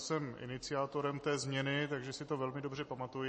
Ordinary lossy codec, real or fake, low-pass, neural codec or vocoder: MP3, 32 kbps; real; 10.8 kHz; none